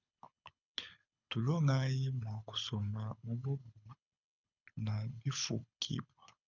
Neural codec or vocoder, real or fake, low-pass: codec, 24 kHz, 6 kbps, HILCodec; fake; 7.2 kHz